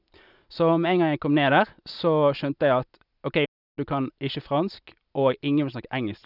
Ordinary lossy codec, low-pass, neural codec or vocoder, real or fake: none; 5.4 kHz; none; real